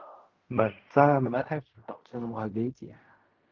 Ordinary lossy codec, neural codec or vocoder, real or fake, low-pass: Opus, 32 kbps; codec, 16 kHz in and 24 kHz out, 0.4 kbps, LongCat-Audio-Codec, fine tuned four codebook decoder; fake; 7.2 kHz